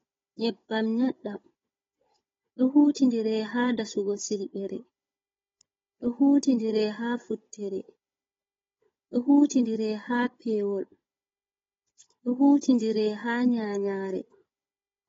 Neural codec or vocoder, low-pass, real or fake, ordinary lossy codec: codec, 16 kHz, 16 kbps, FunCodec, trained on Chinese and English, 50 frames a second; 7.2 kHz; fake; AAC, 24 kbps